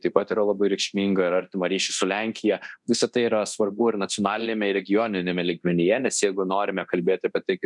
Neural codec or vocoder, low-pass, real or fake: codec, 24 kHz, 0.9 kbps, DualCodec; 10.8 kHz; fake